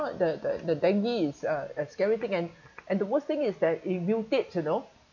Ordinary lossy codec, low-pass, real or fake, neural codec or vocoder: none; 7.2 kHz; real; none